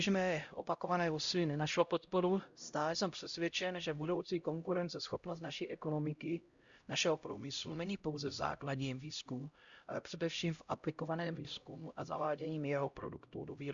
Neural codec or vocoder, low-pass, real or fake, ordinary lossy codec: codec, 16 kHz, 0.5 kbps, X-Codec, HuBERT features, trained on LibriSpeech; 7.2 kHz; fake; Opus, 64 kbps